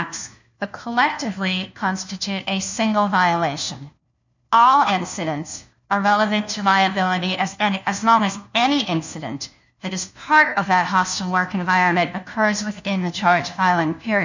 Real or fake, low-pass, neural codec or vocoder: fake; 7.2 kHz; codec, 16 kHz, 1 kbps, FunCodec, trained on LibriTTS, 50 frames a second